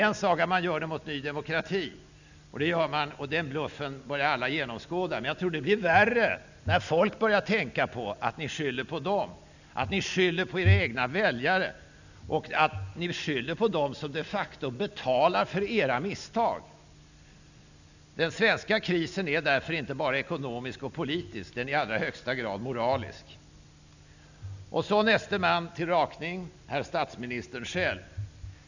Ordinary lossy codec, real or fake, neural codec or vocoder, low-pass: none; real; none; 7.2 kHz